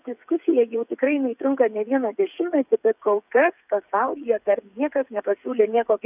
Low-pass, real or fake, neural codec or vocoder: 3.6 kHz; fake; codec, 16 kHz, 8 kbps, FreqCodec, smaller model